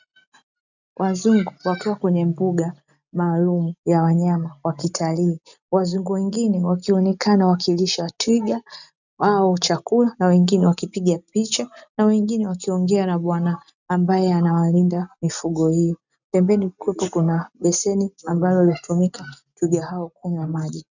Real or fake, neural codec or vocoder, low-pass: real; none; 7.2 kHz